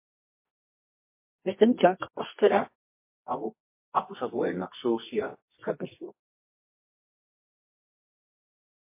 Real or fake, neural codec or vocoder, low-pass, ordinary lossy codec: fake; codec, 24 kHz, 0.9 kbps, WavTokenizer, medium music audio release; 3.6 kHz; MP3, 24 kbps